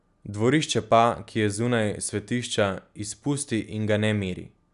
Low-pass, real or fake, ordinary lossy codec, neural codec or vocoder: 10.8 kHz; real; none; none